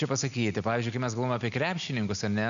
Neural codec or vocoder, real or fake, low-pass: none; real; 7.2 kHz